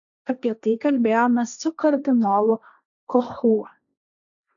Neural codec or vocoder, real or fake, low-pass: codec, 16 kHz, 1.1 kbps, Voila-Tokenizer; fake; 7.2 kHz